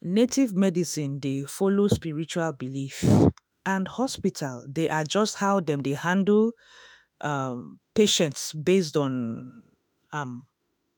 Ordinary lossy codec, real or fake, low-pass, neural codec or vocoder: none; fake; none; autoencoder, 48 kHz, 32 numbers a frame, DAC-VAE, trained on Japanese speech